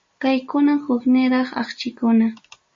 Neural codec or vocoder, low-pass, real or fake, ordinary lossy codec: none; 7.2 kHz; real; MP3, 32 kbps